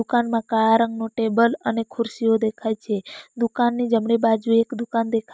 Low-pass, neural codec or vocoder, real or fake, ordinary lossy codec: none; none; real; none